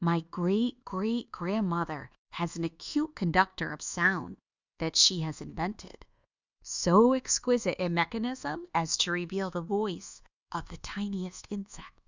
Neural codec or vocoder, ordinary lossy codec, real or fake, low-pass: codec, 24 kHz, 1.2 kbps, DualCodec; Opus, 64 kbps; fake; 7.2 kHz